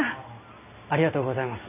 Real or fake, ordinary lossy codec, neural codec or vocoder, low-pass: real; none; none; 3.6 kHz